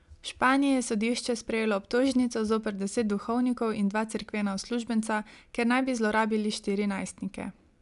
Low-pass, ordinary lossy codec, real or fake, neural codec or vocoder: 10.8 kHz; AAC, 96 kbps; real; none